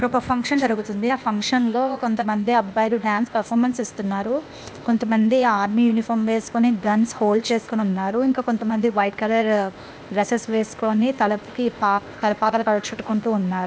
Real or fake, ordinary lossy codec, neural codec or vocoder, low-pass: fake; none; codec, 16 kHz, 0.8 kbps, ZipCodec; none